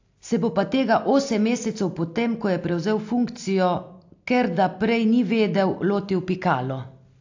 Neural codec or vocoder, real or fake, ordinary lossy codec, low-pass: none; real; AAC, 48 kbps; 7.2 kHz